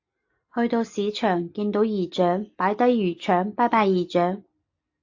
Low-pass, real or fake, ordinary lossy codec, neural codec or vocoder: 7.2 kHz; real; AAC, 48 kbps; none